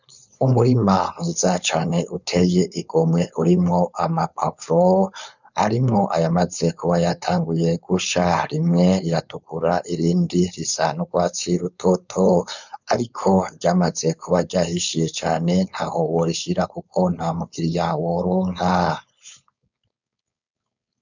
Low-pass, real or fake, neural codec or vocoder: 7.2 kHz; fake; codec, 16 kHz, 4.8 kbps, FACodec